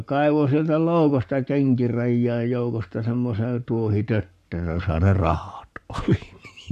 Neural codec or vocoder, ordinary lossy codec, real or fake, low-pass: codec, 44.1 kHz, 7.8 kbps, Pupu-Codec; none; fake; 14.4 kHz